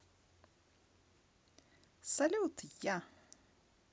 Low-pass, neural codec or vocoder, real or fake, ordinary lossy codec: none; none; real; none